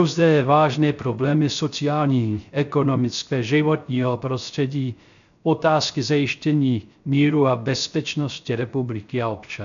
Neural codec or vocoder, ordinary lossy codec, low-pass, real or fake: codec, 16 kHz, 0.3 kbps, FocalCodec; AAC, 96 kbps; 7.2 kHz; fake